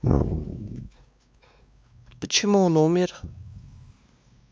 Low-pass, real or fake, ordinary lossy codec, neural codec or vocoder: none; fake; none; codec, 16 kHz, 2 kbps, X-Codec, WavLM features, trained on Multilingual LibriSpeech